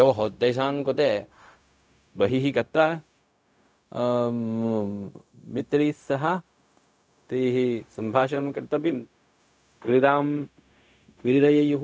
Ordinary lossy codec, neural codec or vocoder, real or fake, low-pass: none; codec, 16 kHz, 0.4 kbps, LongCat-Audio-Codec; fake; none